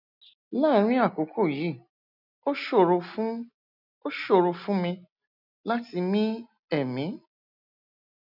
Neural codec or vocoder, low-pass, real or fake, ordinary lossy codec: none; 5.4 kHz; real; none